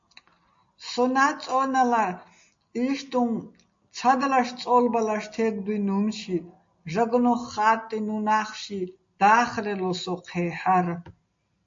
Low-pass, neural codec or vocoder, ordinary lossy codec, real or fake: 7.2 kHz; none; MP3, 48 kbps; real